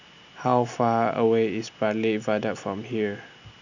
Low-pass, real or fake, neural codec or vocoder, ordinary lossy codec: 7.2 kHz; real; none; none